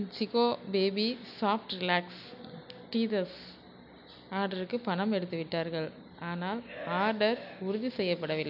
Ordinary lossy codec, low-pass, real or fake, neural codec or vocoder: none; 5.4 kHz; real; none